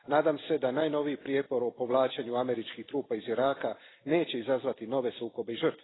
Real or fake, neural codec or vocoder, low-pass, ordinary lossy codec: fake; vocoder, 44.1 kHz, 128 mel bands every 256 samples, BigVGAN v2; 7.2 kHz; AAC, 16 kbps